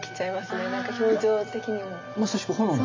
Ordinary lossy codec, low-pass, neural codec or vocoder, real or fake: MP3, 64 kbps; 7.2 kHz; none; real